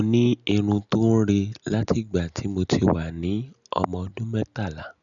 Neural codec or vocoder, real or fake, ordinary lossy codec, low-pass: none; real; none; 7.2 kHz